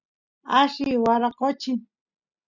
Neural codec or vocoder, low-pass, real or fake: none; 7.2 kHz; real